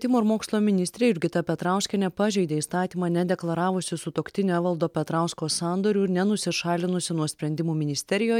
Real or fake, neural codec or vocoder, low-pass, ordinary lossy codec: real; none; 19.8 kHz; MP3, 96 kbps